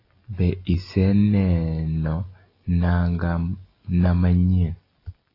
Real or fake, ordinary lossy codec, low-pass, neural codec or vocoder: real; AAC, 24 kbps; 5.4 kHz; none